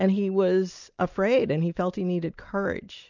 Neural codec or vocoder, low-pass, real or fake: none; 7.2 kHz; real